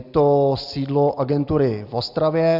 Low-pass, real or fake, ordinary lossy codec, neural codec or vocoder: 5.4 kHz; real; Opus, 64 kbps; none